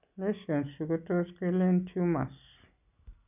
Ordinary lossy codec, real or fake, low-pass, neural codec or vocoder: none; real; 3.6 kHz; none